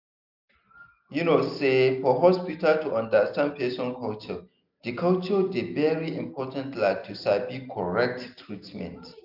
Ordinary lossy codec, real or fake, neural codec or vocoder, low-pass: none; real; none; 5.4 kHz